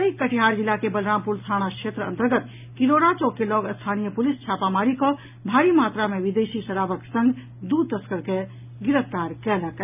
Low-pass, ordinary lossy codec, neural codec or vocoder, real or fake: 3.6 kHz; none; none; real